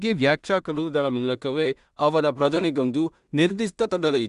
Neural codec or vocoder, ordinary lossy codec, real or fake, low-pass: codec, 16 kHz in and 24 kHz out, 0.4 kbps, LongCat-Audio-Codec, two codebook decoder; Opus, 64 kbps; fake; 10.8 kHz